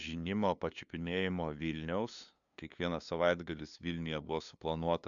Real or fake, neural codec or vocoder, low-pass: fake; codec, 16 kHz, 2 kbps, FunCodec, trained on LibriTTS, 25 frames a second; 7.2 kHz